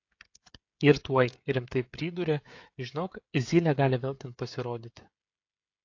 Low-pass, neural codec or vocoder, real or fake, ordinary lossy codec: 7.2 kHz; codec, 16 kHz, 16 kbps, FreqCodec, smaller model; fake; AAC, 48 kbps